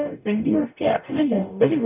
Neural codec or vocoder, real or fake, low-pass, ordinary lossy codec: codec, 44.1 kHz, 0.9 kbps, DAC; fake; 3.6 kHz; none